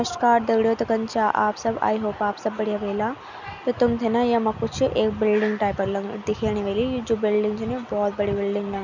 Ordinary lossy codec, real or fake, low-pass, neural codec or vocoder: none; real; 7.2 kHz; none